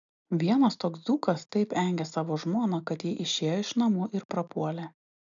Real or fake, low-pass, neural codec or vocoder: real; 7.2 kHz; none